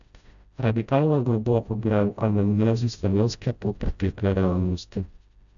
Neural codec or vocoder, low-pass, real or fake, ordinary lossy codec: codec, 16 kHz, 0.5 kbps, FreqCodec, smaller model; 7.2 kHz; fake; none